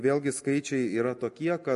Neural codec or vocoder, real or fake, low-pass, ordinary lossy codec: none; real; 14.4 kHz; MP3, 48 kbps